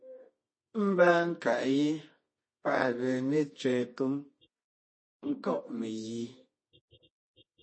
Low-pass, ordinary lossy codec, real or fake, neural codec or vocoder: 9.9 kHz; MP3, 32 kbps; fake; codec, 24 kHz, 0.9 kbps, WavTokenizer, medium music audio release